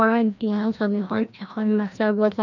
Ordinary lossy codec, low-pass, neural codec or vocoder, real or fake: none; 7.2 kHz; codec, 16 kHz, 1 kbps, FreqCodec, larger model; fake